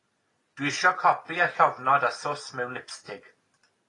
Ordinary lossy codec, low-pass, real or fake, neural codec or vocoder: AAC, 32 kbps; 10.8 kHz; real; none